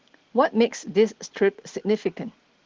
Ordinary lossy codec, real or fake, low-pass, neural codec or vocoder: Opus, 16 kbps; real; 7.2 kHz; none